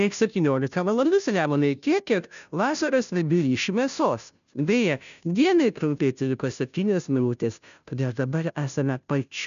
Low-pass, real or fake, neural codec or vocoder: 7.2 kHz; fake; codec, 16 kHz, 0.5 kbps, FunCodec, trained on Chinese and English, 25 frames a second